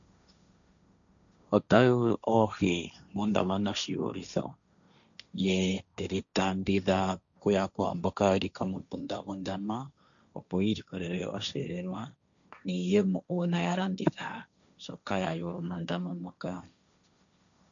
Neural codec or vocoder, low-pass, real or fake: codec, 16 kHz, 1.1 kbps, Voila-Tokenizer; 7.2 kHz; fake